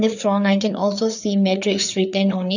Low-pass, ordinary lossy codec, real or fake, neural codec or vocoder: 7.2 kHz; none; fake; codec, 16 kHz, 4 kbps, FreqCodec, larger model